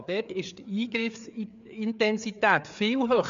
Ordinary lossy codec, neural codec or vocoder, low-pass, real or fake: MP3, 64 kbps; codec, 16 kHz, 4 kbps, FreqCodec, larger model; 7.2 kHz; fake